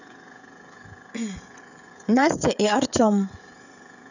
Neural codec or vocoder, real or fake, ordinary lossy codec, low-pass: codec, 16 kHz, 16 kbps, FreqCodec, smaller model; fake; none; 7.2 kHz